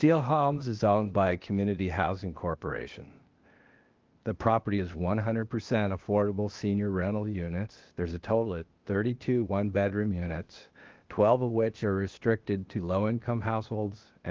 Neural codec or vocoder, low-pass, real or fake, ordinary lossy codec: codec, 16 kHz, 0.8 kbps, ZipCodec; 7.2 kHz; fake; Opus, 32 kbps